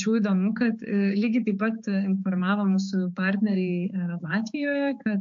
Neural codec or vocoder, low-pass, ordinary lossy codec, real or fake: codec, 16 kHz, 4 kbps, X-Codec, HuBERT features, trained on balanced general audio; 7.2 kHz; MP3, 48 kbps; fake